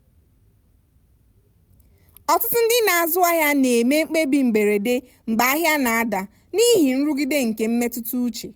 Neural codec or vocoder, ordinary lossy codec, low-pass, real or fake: none; none; none; real